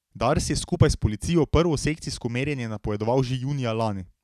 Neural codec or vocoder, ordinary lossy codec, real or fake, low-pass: none; none; real; 14.4 kHz